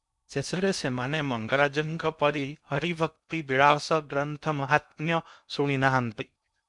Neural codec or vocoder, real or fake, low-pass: codec, 16 kHz in and 24 kHz out, 0.6 kbps, FocalCodec, streaming, 2048 codes; fake; 10.8 kHz